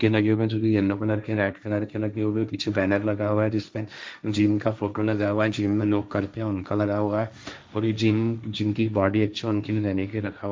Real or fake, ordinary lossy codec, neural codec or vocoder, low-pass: fake; none; codec, 16 kHz, 1.1 kbps, Voila-Tokenizer; none